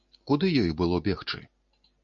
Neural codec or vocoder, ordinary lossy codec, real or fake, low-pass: none; MP3, 96 kbps; real; 7.2 kHz